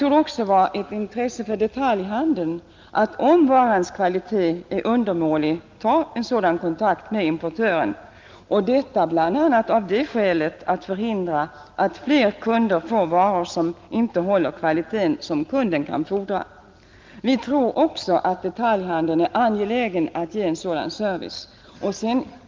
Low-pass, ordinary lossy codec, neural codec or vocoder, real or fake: 7.2 kHz; Opus, 32 kbps; none; real